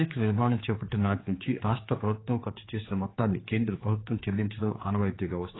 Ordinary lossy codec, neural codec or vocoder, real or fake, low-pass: AAC, 16 kbps; codec, 16 kHz, 4 kbps, X-Codec, HuBERT features, trained on general audio; fake; 7.2 kHz